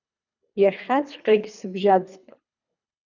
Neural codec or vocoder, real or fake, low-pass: codec, 24 kHz, 3 kbps, HILCodec; fake; 7.2 kHz